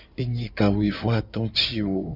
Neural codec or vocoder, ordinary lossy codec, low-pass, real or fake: codec, 16 kHz in and 24 kHz out, 2.2 kbps, FireRedTTS-2 codec; Opus, 64 kbps; 5.4 kHz; fake